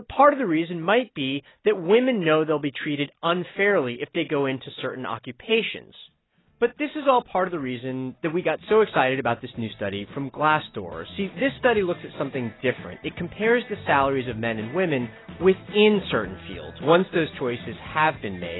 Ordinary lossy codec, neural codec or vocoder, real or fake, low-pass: AAC, 16 kbps; none; real; 7.2 kHz